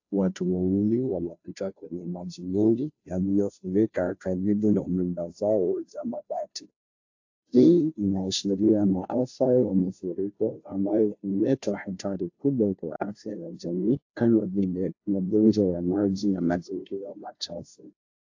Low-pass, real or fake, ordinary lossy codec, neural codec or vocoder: 7.2 kHz; fake; AAC, 48 kbps; codec, 16 kHz, 0.5 kbps, FunCodec, trained on Chinese and English, 25 frames a second